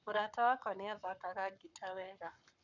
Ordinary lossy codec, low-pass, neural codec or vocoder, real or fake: none; 7.2 kHz; codec, 44.1 kHz, 7.8 kbps, Pupu-Codec; fake